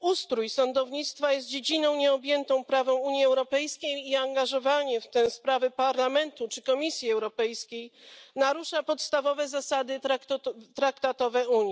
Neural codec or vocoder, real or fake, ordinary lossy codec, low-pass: none; real; none; none